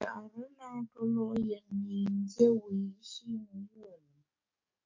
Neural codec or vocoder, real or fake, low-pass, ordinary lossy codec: codec, 44.1 kHz, 7.8 kbps, DAC; fake; 7.2 kHz; MP3, 48 kbps